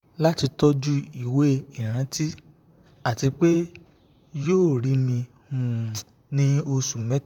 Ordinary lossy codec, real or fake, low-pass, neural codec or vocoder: none; fake; 19.8 kHz; vocoder, 44.1 kHz, 128 mel bands, Pupu-Vocoder